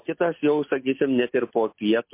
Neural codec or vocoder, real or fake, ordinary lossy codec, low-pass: none; real; MP3, 24 kbps; 3.6 kHz